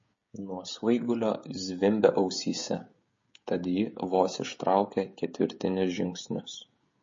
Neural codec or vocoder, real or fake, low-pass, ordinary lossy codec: codec, 16 kHz, 16 kbps, FreqCodec, smaller model; fake; 7.2 kHz; MP3, 32 kbps